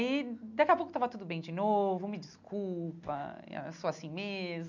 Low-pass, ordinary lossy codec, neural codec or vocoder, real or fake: 7.2 kHz; none; none; real